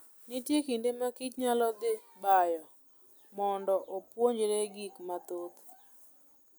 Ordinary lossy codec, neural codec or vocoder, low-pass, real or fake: none; none; none; real